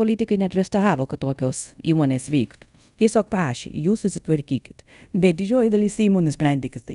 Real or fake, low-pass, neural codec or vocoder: fake; 10.8 kHz; codec, 24 kHz, 0.5 kbps, DualCodec